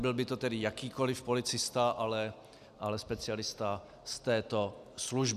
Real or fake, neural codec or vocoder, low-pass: real; none; 14.4 kHz